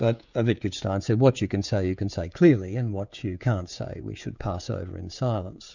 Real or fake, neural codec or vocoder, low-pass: fake; codec, 16 kHz, 16 kbps, FreqCodec, smaller model; 7.2 kHz